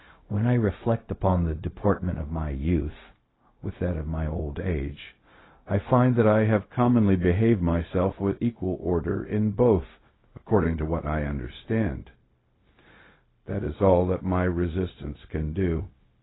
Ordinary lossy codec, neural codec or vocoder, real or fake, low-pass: AAC, 16 kbps; codec, 16 kHz, 0.4 kbps, LongCat-Audio-Codec; fake; 7.2 kHz